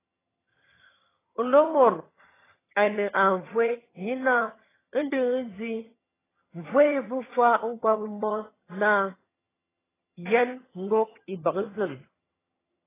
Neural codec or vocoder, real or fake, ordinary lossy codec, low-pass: vocoder, 22.05 kHz, 80 mel bands, HiFi-GAN; fake; AAC, 16 kbps; 3.6 kHz